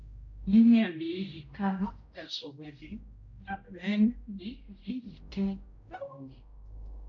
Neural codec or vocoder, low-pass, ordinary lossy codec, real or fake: codec, 16 kHz, 0.5 kbps, X-Codec, HuBERT features, trained on general audio; 7.2 kHz; MP3, 64 kbps; fake